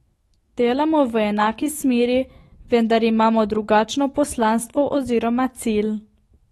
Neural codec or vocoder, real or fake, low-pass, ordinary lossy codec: autoencoder, 48 kHz, 128 numbers a frame, DAC-VAE, trained on Japanese speech; fake; 19.8 kHz; AAC, 32 kbps